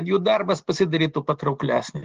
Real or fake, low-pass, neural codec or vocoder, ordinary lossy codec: real; 7.2 kHz; none; Opus, 16 kbps